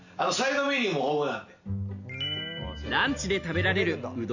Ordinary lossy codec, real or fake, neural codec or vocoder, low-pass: none; real; none; 7.2 kHz